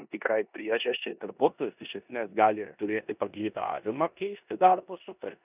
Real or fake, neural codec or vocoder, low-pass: fake; codec, 16 kHz in and 24 kHz out, 0.9 kbps, LongCat-Audio-Codec, four codebook decoder; 3.6 kHz